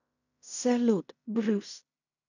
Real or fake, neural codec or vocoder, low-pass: fake; codec, 16 kHz in and 24 kHz out, 0.4 kbps, LongCat-Audio-Codec, fine tuned four codebook decoder; 7.2 kHz